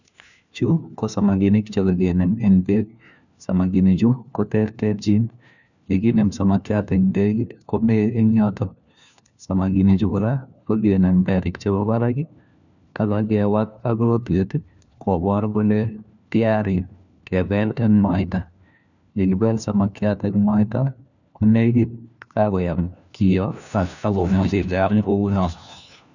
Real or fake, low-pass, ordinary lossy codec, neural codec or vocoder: fake; 7.2 kHz; none; codec, 16 kHz, 1 kbps, FunCodec, trained on LibriTTS, 50 frames a second